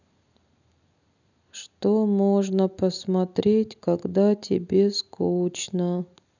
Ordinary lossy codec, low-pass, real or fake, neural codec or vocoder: none; 7.2 kHz; real; none